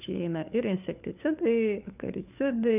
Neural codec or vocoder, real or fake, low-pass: codec, 16 kHz, 4 kbps, FunCodec, trained on LibriTTS, 50 frames a second; fake; 3.6 kHz